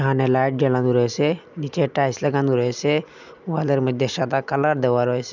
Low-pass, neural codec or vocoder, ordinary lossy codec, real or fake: 7.2 kHz; none; none; real